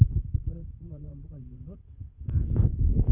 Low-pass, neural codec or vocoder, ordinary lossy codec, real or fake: 3.6 kHz; vocoder, 44.1 kHz, 128 mel bands, Pupu-Vocoder; none; fake